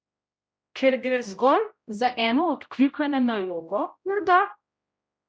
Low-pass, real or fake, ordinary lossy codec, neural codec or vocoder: none; fake; none; codec, 16 kHz, 0.5 kbps, X-Codec, HuBERT features, trained on general audio